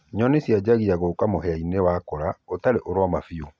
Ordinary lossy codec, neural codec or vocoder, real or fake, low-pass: none; none; real; none